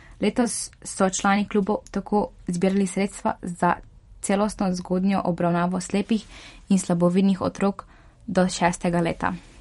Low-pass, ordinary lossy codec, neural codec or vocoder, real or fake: 19.8 kHz; MP3, 48 kbps; vocoder, 44.1 kHz, 128 mel bands every 512 samples, BigVGAN v2; fake